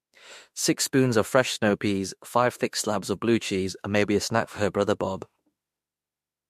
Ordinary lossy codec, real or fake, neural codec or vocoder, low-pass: MP3, 64 kbps; fake; autoencoder, 48 kHz, 32 numbers a frame, DAC-VAE, trained on Japanese speech; 14.4 kHz